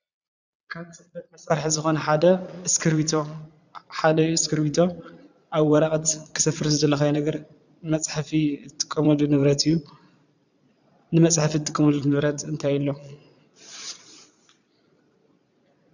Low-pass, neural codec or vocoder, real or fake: 7.2 kHz; vocoder, 22.05 kHz, 80 mel bands, Vocos; fake